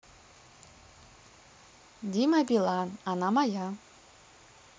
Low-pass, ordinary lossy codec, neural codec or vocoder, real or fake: none; none; none; real